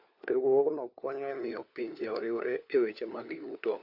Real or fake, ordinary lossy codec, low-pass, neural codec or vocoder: fake; AAC, 48 kbps; 5.4 kHz; codec, 16 kHz, 4 kbps, FunCodec, trained on LibriTTS, 50 frames a second